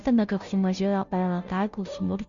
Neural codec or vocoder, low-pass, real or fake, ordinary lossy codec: codec, 16 kHz, 0.5 kbps, FunCodec, trained on Chinese and English, 25 frames a second; 7.2 kHz; fake; Opus, 64 kbps